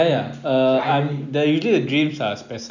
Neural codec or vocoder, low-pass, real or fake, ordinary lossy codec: none; 7.2 kHz; real; none